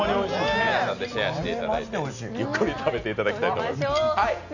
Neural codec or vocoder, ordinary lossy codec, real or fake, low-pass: none; none; real; 7.2 kHz